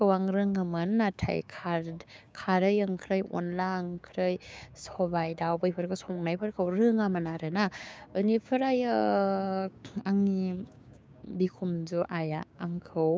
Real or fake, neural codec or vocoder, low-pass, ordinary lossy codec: fake; codec, 16 kHz, 6 kbps, DAC; none; none